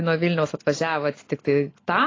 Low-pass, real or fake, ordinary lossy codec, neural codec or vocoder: 7.2 kHz; real; AAC, 32 kbps; none